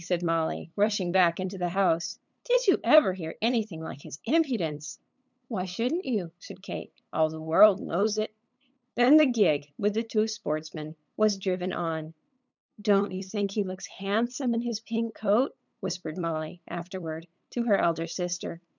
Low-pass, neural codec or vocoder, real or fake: 7.2 kHz; codec, 16 kHz, 8 kbps, FunCodec, trained on LibriTTS, 25 frames a second; fake